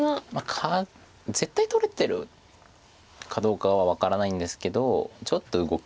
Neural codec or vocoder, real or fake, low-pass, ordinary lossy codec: none; real; none; none